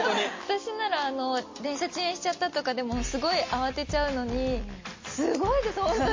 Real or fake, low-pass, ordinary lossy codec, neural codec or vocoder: real; 7.2 kHz; MP3, 32 kbps; none